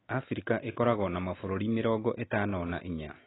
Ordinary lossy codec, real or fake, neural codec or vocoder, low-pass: AAC, 16 kbps; real; none; 7.2 kHz